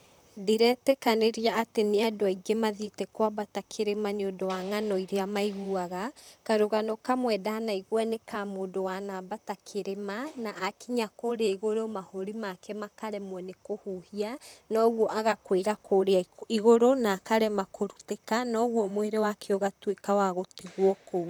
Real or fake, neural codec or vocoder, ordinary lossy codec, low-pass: fake; vocoder, 44.1 kHz, 128 mel bands, Pupu-Vocoder; none; none